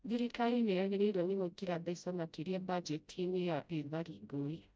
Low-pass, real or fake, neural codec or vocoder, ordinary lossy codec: none; fake; codec, 16 kHz, 0.5 kbps, FreqCodec, smaller model; none